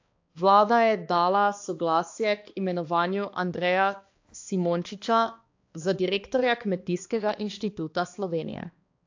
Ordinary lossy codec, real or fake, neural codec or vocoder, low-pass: AAC, 48 kbps; fake; codec, 16 kHz, 2 kbps, X-Codec, HuBERT features, trained on balanced general audio; 7.2 kHz